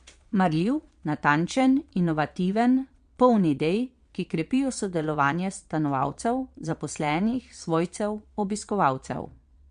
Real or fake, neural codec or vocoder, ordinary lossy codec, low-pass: fake; vocoder, 22.05 kHz, 80 mel bands, Vocos; MP3, 64 kbps; 9.9 kHz